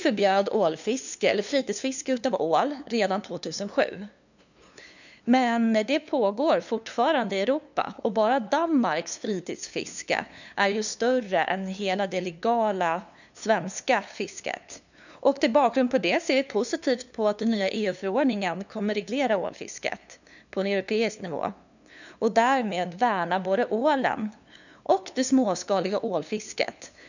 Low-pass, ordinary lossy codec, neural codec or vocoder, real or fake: 7.2 kHz; none; codec, 16 kHz, 2 kbps, FunCodec, trained on LibriTTS, 25 frames a second; fake